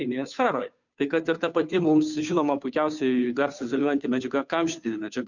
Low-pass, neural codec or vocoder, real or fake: 7.2 kHz; codec, 16 kHz, 2 kbps, FunCodec, trained on Chinese and English, 25 frames a second; fake